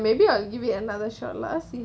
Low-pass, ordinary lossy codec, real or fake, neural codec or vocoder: none; none; real; none